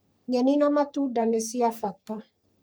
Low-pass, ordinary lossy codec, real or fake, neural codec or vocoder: none; none; fake; codec, 44.1 kHz, 3.4 kbps, Pupu-Codec